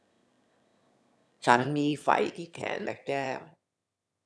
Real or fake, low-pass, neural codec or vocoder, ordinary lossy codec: fake; none; autoencoder, 22.05 kHz, a latent of 192 numbers a frame, VITS, trained on one speaker; none